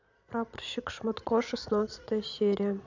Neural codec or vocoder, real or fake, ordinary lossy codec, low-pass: vocoder, 22.05 kHz, 80 mel bands, Vocos; fake; none; 7.2 kHz